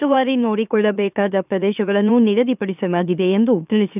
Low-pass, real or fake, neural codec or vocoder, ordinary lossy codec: 3.6 kHz; fake; autoencoder, 44.1 kHz, a latent of 192 numbers a frame, MeloTTS; none